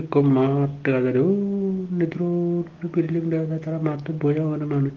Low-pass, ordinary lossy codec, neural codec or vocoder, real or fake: 7.2 kHz; Opus, 16 kbps; none; real